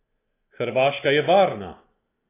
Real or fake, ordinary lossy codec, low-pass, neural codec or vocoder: real; AAC, 16 kbps; 3.6 kHz; none